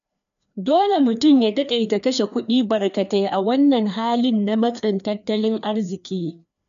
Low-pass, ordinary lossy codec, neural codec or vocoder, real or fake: 7.2 kHz; none; codec, 16 kHz, 2 kbps, FreqCodec, larger model; fake